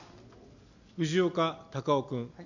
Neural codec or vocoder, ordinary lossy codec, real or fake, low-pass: none; none; real; 7.2 kHz